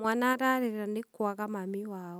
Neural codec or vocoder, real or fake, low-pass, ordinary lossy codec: none; real; none; none